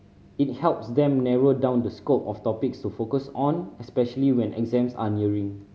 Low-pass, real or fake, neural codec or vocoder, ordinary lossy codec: none; real; none; none